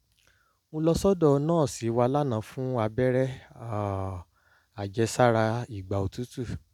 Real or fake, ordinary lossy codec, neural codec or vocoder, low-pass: real; none; none; 19.8 kHz